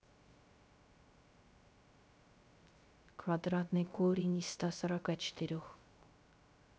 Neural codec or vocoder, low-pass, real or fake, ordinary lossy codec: codec, 16 kHz, 0.3 kbps, FocalCodec; none; fake; none